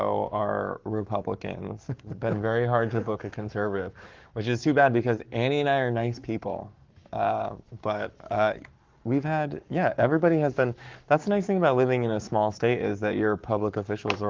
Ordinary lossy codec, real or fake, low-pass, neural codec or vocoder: Opus, 32 kbps; fake; 7.2 kHz; codec, 44.1 kHz, 7.8 kbps, Pupu-Codec